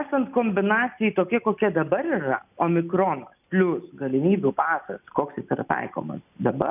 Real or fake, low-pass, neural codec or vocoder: real; 3.6 kHz; none